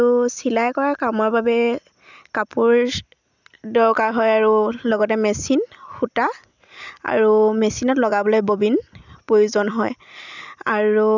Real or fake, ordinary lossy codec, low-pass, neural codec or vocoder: real; none; 7.2 kHz; none